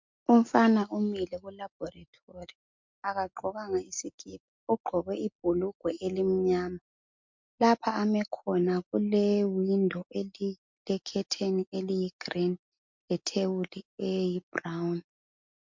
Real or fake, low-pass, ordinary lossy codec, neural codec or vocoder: real; 7.2 kHz; MP3, 64 kbps; none